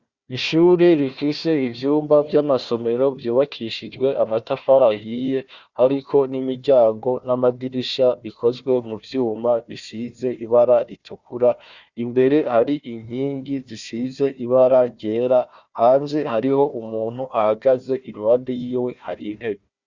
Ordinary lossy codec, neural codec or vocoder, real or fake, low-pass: Opus, 64 kbps; codec, 16 kHz, 1 kbps, FunCodec, trained on Chinese and English, 50 frames a second; fake; 7.2 kHz